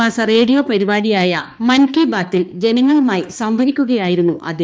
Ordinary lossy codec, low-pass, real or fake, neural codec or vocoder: none; none; fake; codec, 16 kHz, 2 kbps, X-Codec, HuBERT features, trained on balanced general audio